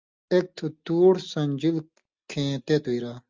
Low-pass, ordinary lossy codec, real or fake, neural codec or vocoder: 7.2 kHz; Opus, 32 kbps; real; none